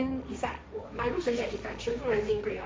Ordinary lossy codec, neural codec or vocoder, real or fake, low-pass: none; codec, 16 kHz, 1.1 kbps, Voila-Tokenizer; fake; none